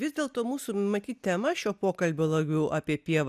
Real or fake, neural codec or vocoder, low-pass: real; none; 14.4 kHz